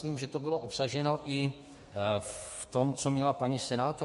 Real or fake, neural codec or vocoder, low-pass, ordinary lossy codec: fake; codec, 32 kHz, 1.9 kbps, SNAC; 14.4 kHz; MP3, 48 kbps